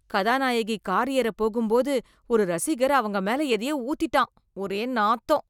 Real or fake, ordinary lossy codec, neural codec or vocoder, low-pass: real; none; none; 19.8 kHz